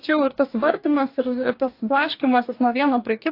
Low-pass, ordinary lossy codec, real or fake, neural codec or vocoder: 5.4 kHz; AAC, 32 kbps; fake; codec, 44.1 kHz, 2.6 kbps, DAC